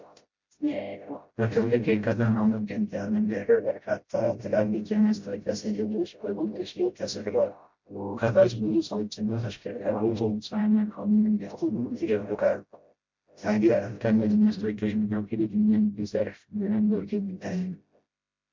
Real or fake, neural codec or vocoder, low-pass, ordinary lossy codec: fake; codec, 16 kHz, 0.5 kbps, FreqCodec, smaller model; 7.2 kHz; MP3, 48 kbps